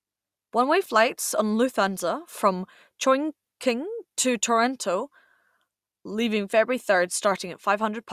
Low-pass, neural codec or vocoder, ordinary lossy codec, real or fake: 14.4 kHz; none; Opus, 64 kbps; real